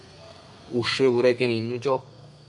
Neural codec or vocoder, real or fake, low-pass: codec, 32 kHz, 1.9 kbps, SNAC; fake; 10.8 kHz